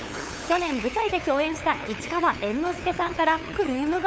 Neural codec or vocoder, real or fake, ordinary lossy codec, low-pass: codec, 16 kHz, 8 kbps, FunCodec, trained on LibriTTS, 25 frames a second; fake; none; none